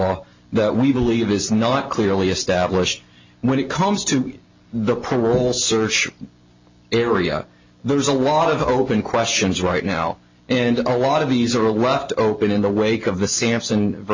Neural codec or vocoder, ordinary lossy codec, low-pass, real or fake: none; MP3, 64 kbps; 7.2 kHz; real